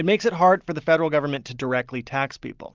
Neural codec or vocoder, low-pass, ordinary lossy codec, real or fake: none; 7.2 kHz; Opus, 24 kbps; real